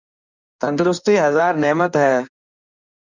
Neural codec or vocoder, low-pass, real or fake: codec, 16 kHz in and 24 kHz out, 1.1 kbps, FireRedTTS-2 codec; 7.2 kHz; fake